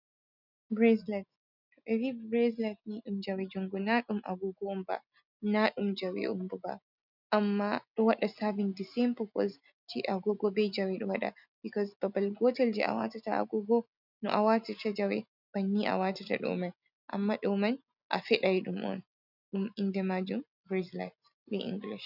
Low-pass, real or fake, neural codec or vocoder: 5.4 kHz; real; none